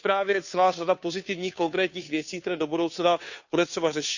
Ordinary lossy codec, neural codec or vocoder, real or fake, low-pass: none; codec, 16 kHz, 2 kbps, FunCodec, trained on Chinese and English, 25 frames a second; fake; 7.2 kHz